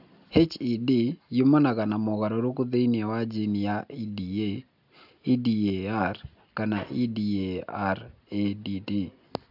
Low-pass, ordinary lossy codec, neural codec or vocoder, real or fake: 5.4 kHz; AAC, 48 kbps; none; real